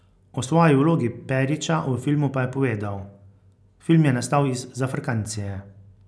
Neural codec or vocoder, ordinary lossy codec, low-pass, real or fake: none; none; none; real